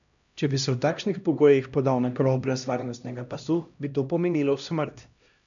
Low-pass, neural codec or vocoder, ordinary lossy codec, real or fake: 7.2 kHz; codec, 16 kHz, 1 kbps, X-Codec, HuBERT features, trained on LibriSpeech; none; fake